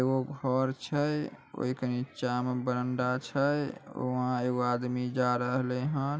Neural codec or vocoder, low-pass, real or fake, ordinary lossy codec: none; none; real; none